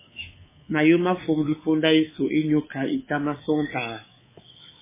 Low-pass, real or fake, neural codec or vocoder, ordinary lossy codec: 3.6 kHz; fake; codec, 24 kHz, 3.1 kbps, DualCodec; MP3, 16 kbps